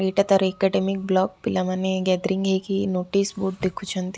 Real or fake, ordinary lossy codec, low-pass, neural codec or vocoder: real; none; none; none